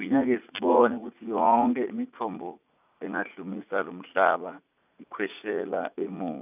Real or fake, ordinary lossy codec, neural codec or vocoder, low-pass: fake; none; vocoder, 44.1 kHz, 80 mel bands, Vocos; 3.6 kHz